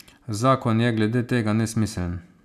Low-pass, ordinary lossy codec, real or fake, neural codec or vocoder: 14.4 kHz; none; real; none